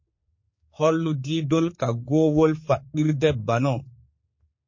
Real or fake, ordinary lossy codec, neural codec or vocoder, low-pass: fake; MP3, 32 kbps; codec, 16 kHz, 4 kbps, X-Codec, HuBERT features, trained on general audio; 7.2 kHz